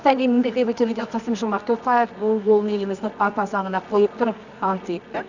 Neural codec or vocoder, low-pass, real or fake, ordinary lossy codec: codec, 24 kHz, 0.9 kbps, WavTokenizer, medium music audio release; 7.2 kHz; fake; none